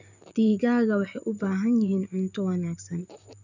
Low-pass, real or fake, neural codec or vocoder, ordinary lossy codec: 7.2 kHz; fake; vocoder, 44.1 kHz, 128 mel bands, Pupu-Vocoder; none